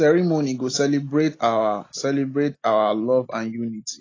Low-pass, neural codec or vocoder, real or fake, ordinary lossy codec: 7.2 kHz; none; real; AAC, 32 kbps